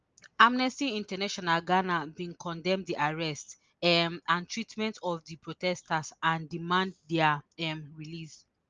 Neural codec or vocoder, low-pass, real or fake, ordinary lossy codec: none; 7.2 kHz; real; Opus, 24 kbps